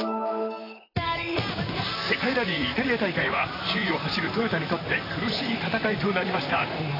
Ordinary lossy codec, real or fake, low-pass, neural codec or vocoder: AAC, 24 kbps; fake; 5.4 kHz; vocoder, 44.1 kHz, 128 mel bands, Pupu-Vocoder